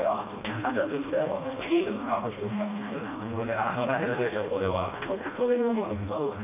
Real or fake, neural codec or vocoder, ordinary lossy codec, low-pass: fake; codec, 16 kHz, 1 kbps, FreqCodec, smaller model; none; 3.6 kHz